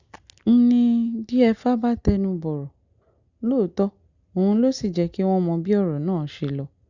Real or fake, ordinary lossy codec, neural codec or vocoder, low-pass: real; Opus, 64 kbps; none; 7.2 kHz